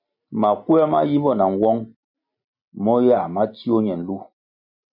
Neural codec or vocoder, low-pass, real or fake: none; 5.4 kHz; real